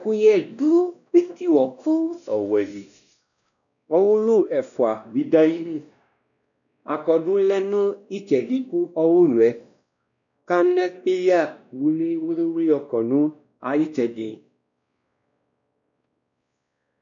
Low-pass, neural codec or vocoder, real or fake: 7.2 kHz; codec, 16 kHz, 1 kbps, X-Codec, WavLM features, trained on Multilingual LibriSpeech; fake